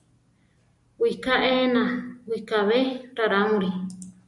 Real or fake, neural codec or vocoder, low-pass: real; none; 10.8 kHz